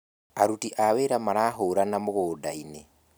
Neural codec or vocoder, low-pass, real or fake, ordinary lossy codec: none; none; real; none